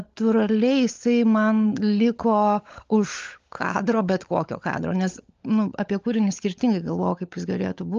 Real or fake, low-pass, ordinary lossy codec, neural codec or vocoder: fake; 7.2 kHz; Opus, 24 kbps; codec, 16 kHz, 16 kbps, FunCodec, trained on LibriTTS, 50 frames a second